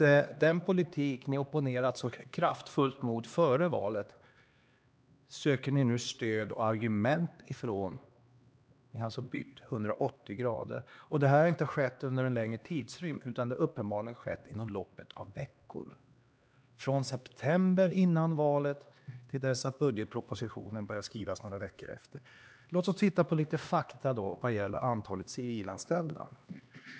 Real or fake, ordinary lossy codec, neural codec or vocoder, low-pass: fake; none; codec, 16 kHz, 2 kbps, X-Codec, HuBERT features, trained on LibriSpeech; none